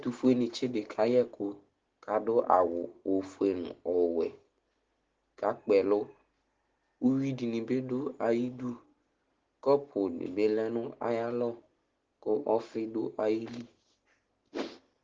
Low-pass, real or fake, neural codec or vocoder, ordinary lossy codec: 7.2 kHz; real; none; Opus, 16 kbps